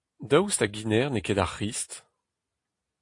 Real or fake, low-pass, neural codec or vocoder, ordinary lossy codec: real; 10.8 kHz; none; AAC, 48 kbps